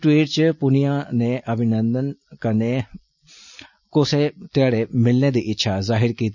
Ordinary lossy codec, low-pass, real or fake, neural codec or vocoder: none; 7.2 kHz; real; none